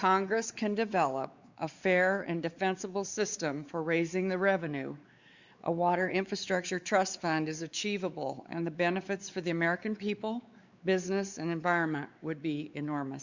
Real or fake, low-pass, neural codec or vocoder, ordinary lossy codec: fake; 7.2 kHz; codec, 16 kHz, 4 kbps, X-Codec, WavLM features, trained on Multilingual LibriSpeech; Opus, 64 kbps